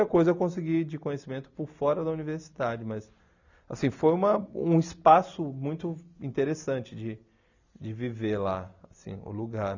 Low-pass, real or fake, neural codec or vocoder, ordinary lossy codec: 7.2 kHz; real; none; none